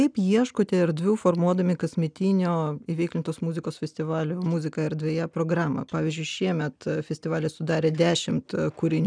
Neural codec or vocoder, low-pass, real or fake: none; 9.9 kHz; real